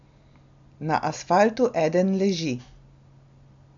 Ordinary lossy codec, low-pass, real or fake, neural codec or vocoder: MP3, 64 kbps; 7.2 kHz; real; none